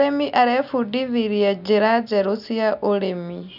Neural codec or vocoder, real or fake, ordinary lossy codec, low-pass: none; real; none; 5.4 kHz